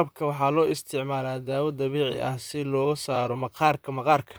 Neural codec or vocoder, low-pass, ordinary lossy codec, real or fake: vocoder, 44.1 kHz, 128 mel bands, Pupu-Vocoder; none; none; fake